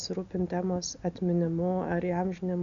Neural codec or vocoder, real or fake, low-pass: none; real; 7.2 kHz